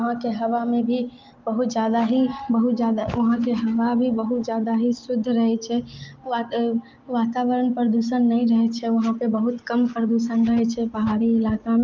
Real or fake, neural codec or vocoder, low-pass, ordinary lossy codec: real; none; 7.2 kHz; Opus, 32 kbps